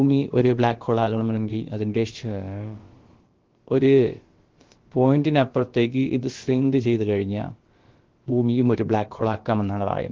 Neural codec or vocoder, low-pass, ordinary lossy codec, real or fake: codec, 16 kHz, about 1 kbps, DyCAST, with the encoder's durations; 7.2 kHz; Opus, 16 kbps; fake